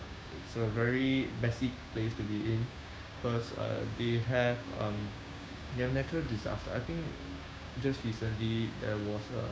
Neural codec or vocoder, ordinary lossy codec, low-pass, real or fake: codec, 16 kHz, 6 kbps, DAC; none; none; fake